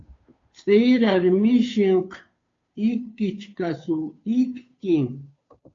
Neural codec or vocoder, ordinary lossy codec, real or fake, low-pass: codec, 16 kHz, 2 kbps, FunCodec, trained on Chinese and English, 25 frames a second; AAC, 48 kbps; fake; 7.2 kHz